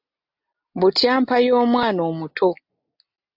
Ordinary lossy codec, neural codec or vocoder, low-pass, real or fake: AAC, 48 kbps; none; 5.4 kHz; real